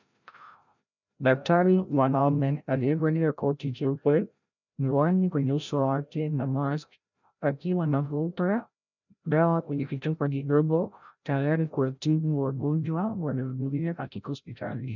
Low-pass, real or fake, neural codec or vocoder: 7.2 kHz; fake; codec, 16 kHz, 0.5 kbps, FreqCodec, larger model